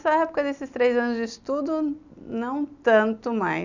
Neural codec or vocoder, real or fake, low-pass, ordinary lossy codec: none; real; 7.2 kHz; none